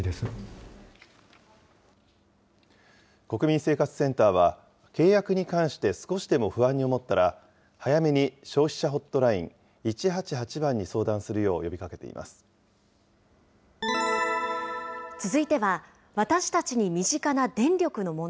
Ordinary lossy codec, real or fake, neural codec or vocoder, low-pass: none; real; none; none